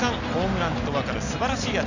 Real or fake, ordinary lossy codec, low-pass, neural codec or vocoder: real; none; 7.2 kHz; none